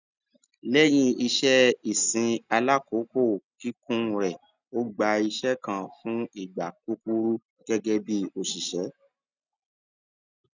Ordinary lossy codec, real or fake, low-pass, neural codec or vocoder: none; real; 7.2 kHz; none